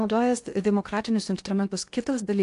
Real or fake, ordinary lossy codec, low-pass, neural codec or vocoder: fake; AAC, 64 kbps; 10.8 kHz; codec, 16 kHz in and 24 kHz out, 0.6 kbps, FocalCodec, streaming, 2048 codes